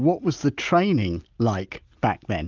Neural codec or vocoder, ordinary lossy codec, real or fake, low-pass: none; Opus, 32 kbps; real; 7.2 kHz